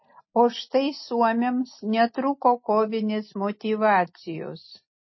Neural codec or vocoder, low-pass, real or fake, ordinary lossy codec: none; 7.2 kHz; real; MP3, 24 kbps